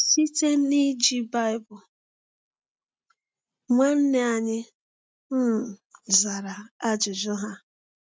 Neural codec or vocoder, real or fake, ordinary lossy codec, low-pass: none; real; none; none